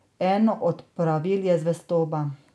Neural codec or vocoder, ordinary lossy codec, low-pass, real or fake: none; none; none; real